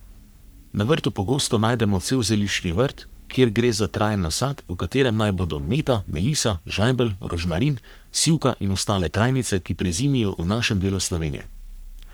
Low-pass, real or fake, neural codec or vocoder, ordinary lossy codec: none; fake; codec, 44.1 kHz, 3.4 kbps, Pupu-Codec; none